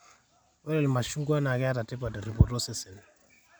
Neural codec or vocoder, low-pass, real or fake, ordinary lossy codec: none; none; real; none